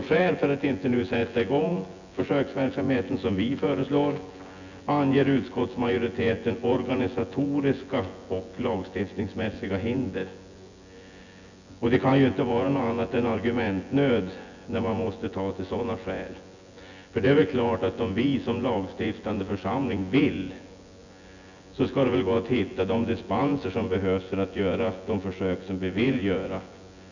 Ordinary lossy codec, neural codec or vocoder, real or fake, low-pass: none; vocoder, 24 kHz, 100 mel bands, Vocos; fake; 7.2 kHz